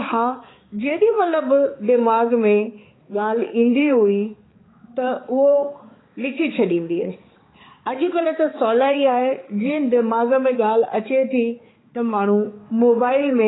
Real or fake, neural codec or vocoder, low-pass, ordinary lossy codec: fake; codec, 16 kHz, 4 kbps, X-Codec, HuBERT features, trained on balanced general audio; 7.2 kHz; AAC, 16 kbps